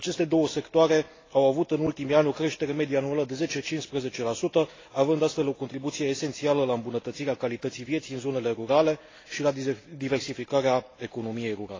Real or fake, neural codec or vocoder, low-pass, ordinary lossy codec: real; none; 7.2 kHz; AAC, 32 kbps